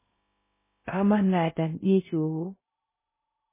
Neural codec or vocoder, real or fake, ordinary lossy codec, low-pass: codec, 16 kHz in and 24 kHz out, 0.6 kbps, FocalCodec, streaming, 2048 codes; fake; MP3, 16 kbps; 3.6 kHz